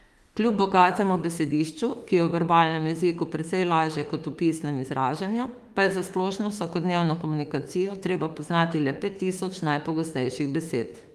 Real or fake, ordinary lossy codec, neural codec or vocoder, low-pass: fake; Opus, 32 kbps; autoencoder, 48 kHz, 32 numbers a frame, DAC-VAE, trained on Japanese speech; 14.4 kHz